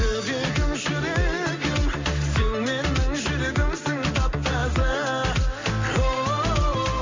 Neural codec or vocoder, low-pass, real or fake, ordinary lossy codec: none; 7.2 kHz; real; MP3, 48 kbps